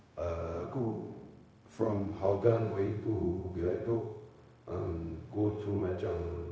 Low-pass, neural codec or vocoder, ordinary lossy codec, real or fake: none; codec, 16 kHz, 0.4 kbps, LongCat-Audio-Codec; none; fake